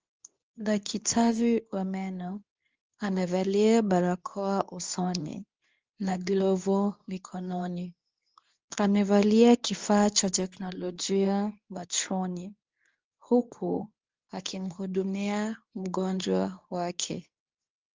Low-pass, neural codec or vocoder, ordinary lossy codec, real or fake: 7.2 kHz; codec, 24 kHz, 0.9 kbps, WavTokenizer, medium speech release version 2; Opus, 24 kbps; fake